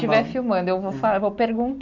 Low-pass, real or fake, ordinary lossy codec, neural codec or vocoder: 7.2 kHz; real; MP3, 64 kbps; none